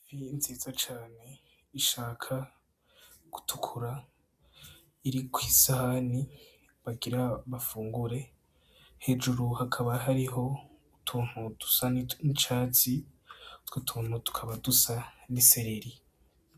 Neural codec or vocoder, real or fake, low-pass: none; real; 14.4 kHz